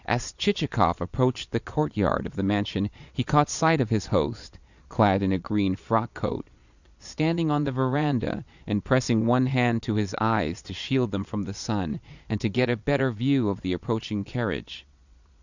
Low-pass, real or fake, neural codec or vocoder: 7.2 kHz; fake; vocoder, 22.05 kHz, 80 mel bands, Vocos